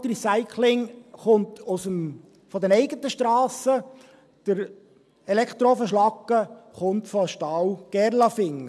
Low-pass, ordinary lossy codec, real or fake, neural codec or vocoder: none; none; real; none